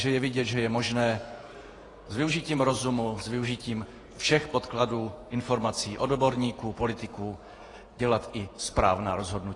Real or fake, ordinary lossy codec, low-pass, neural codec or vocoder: fake; AAC, 32 kbps; 10.8 kHz; vocoder, 48 kHz, 128 mel bands, Vocos